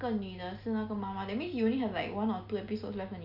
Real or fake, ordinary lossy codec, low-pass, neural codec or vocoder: real; none; 5.4 kHz; none